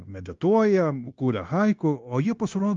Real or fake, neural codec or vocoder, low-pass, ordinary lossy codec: fake; codec, 16 kHz, 0.9 kbps, LongCat-Audio-Codec; 7.2 kHz; Opus, 32 kbps